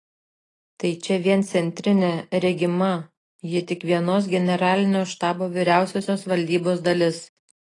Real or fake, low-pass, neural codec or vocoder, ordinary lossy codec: real; 10.8 kHz; none; AAC, 32 kbps